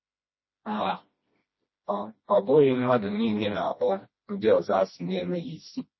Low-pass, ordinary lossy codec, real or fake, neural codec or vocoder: 7.2 kHz; MP3, 24 kbps; fake; codec, 16 kHz, 1 kbps, FreqCodec, smaller model